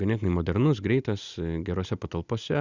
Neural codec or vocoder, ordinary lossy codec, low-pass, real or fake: none; Opus, 64 kbps; 7.2 kHz; real